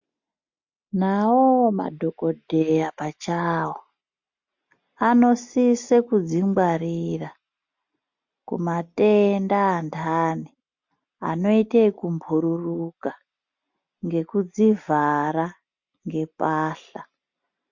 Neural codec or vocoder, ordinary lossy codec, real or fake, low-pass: none; MP3, 48 kbps; real; 7.2 kHz